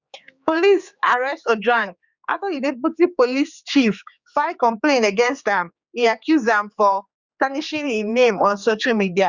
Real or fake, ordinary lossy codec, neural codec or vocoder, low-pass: fake; Opus, 64 kbps; codec, 16 kHz, 4 kbps, X-Codec, HuBERT features, trained on general audio; 7.2 kHz